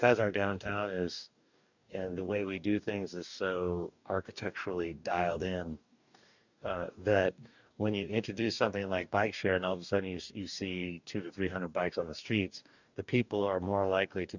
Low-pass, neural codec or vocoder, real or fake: 7.2 kHz; codec, 44.1 kHz, 2.6 kbps, DAC; fake